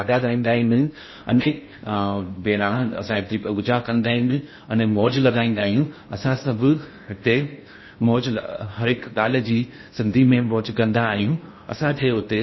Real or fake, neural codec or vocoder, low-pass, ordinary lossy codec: fake; codec, 16 kHz in and 24 kHz out, 0.6 kbps, FocalCodec, streaming, 2048 codes; 7.2 kHz; MP3, 24 kbps